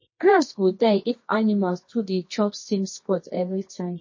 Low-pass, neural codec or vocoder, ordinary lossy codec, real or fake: 7.2 kHz; codec, 24 kHz, 0.9 kbps, WavTokenizer, medium music audio release; MP3, 32 kbps; fake